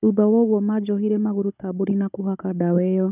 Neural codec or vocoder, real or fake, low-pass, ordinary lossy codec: codec, 16 kHz, 16 kbps, FunCodec, trained on Chinese and English, 50 frames a second; fake; 3.6 kHz; none